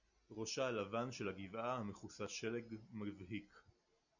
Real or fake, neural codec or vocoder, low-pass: real; none; 7.2 kHz